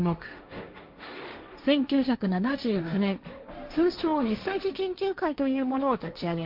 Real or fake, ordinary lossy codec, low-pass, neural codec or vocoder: fake; MP3, 48 kbps; 5.4 kHz; codec, 16 kHz, 1.1 kbps, Voila-Tokenizer